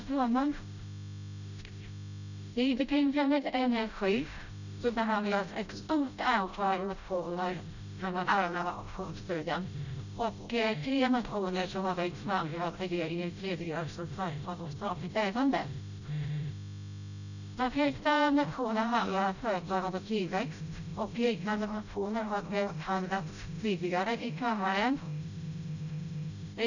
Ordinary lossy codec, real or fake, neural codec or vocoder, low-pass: none; fake; codec, 16 kHz, 0.5 kbps, FreqCodec, smaller model; 7.2 kHz